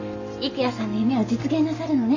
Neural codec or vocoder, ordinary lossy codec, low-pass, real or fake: none; none; 7.2 kHz; real